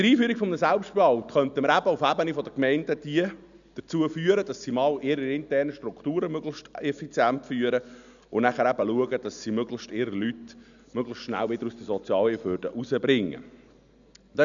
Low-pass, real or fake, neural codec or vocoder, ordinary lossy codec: 7.2 kHz; real; none; none